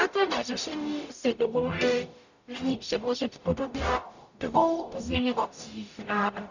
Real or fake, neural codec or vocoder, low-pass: fake; codec, 44.1 kHz, 0.9 kbps, DAC; 7.2 kHz